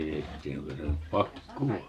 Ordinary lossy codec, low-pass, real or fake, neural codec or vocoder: MP3, 64 kbps; 14.4 kHz; real; none